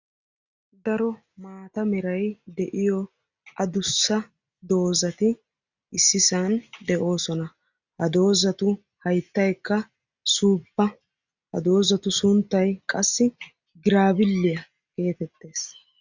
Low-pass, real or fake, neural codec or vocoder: 7.2 kHz; real; none